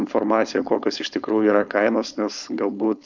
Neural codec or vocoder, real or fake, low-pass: codec, 16 kHz, 4.8 kbps, FACodec; fake; 7.2 kHz